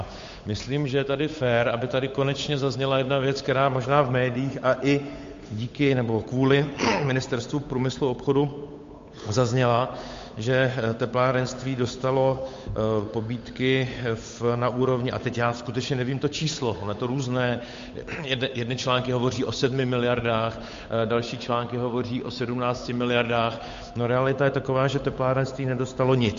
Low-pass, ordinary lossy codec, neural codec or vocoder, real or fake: 7.2 kHz; MP3, 48 kbps; codec, 16 kHz, 8 kbps, FunCodec, trained on Chinese and English, 25 frames a second; fake